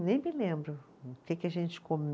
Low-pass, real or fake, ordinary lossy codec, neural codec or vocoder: none; real; none; none